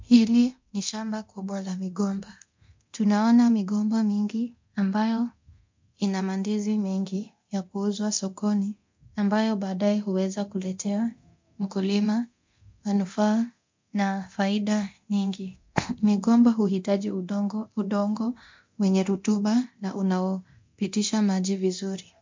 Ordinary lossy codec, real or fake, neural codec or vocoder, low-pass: MP3, 48 kbps; fake; codec, 24 kHz, 0.9 kbps, DualCodec; 7.2 kHz